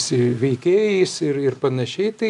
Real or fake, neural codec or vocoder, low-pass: real; none; 10.8 kHz